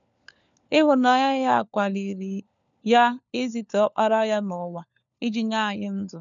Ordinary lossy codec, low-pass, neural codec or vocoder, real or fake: none; 7.2 kHz; codec, 16 kHz, 4 kbps, FunCodec, trained on LibriTTS, 50 frames a second; fake